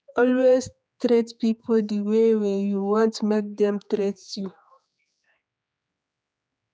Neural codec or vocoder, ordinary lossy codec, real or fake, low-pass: codec, 16 kHz, 4 kbps, X-Codec, HuBERT features, trained on general audio; none; fake; none